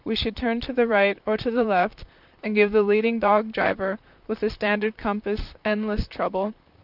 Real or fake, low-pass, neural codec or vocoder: fake; 5.4 kHz; vocoder, 44.1 kHz, 128 mel bands, Pupu-Vocoder